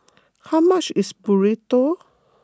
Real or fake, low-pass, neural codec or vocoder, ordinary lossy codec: real; none; none; none